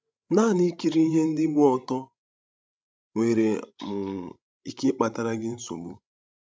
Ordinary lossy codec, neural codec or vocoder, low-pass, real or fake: none; codec, 16 kHz, 16 kbps, FreqCodec, larger model; none; fake